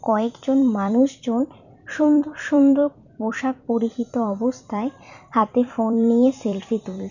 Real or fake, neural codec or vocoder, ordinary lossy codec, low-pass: fake; vocoder, 44.1 kHz, 128 mel bands every 256 samples, BigVGAN v2; none; 7.2 kHz